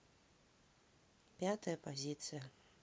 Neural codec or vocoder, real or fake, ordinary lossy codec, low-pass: none; real; none; none